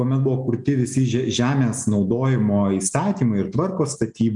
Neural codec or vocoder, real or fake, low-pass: none; real; 10.8 kHz